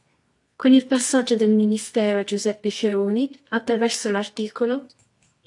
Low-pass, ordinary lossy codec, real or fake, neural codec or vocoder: 10.8 kHz; AAC, 64 kbps; fake; codec, 24 kHz, 0.9 kbps, WavTokenizer, medium music audio release